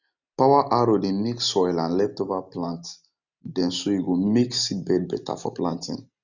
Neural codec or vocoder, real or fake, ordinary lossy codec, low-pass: none; real; none; 7.2 kHz